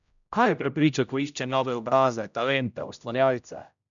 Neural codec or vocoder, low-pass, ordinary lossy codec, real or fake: codec, 16 kHz, 0.5 kbps, X-Codec, HuBERT features, trained on general audio; 7.2 kHz; none; fake